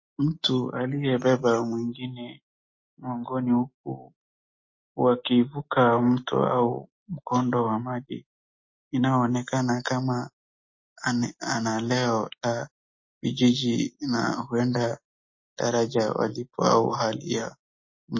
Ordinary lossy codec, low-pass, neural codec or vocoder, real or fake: MP3, 32 kbps; 7.2 kHz; none; real